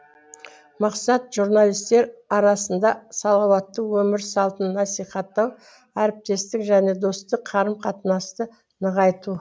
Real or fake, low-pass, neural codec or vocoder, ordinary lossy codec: real; none; none; none